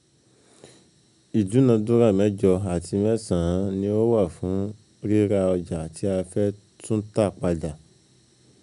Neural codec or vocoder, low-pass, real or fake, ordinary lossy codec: none; 10.8 kHz; real; none